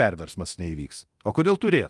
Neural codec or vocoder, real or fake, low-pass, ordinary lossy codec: codec, 24 kHz, 0.9 kbps, DualCodec; fake; 10.8 kHz; Opus, 32 kbps